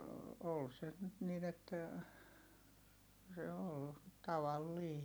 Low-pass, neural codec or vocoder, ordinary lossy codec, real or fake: none; none; none; real